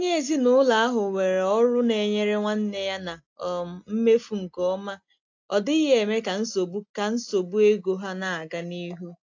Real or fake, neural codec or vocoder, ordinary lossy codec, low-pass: real; none; AAC, 48 kbps; 7.2 kHz